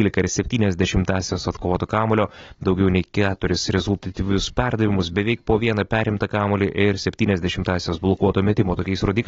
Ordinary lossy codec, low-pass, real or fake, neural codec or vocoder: AAC, 24 kbps; 7.2 kHz; real; none